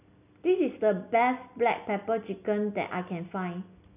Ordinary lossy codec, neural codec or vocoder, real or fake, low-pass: none; none; real; 3.6 kHz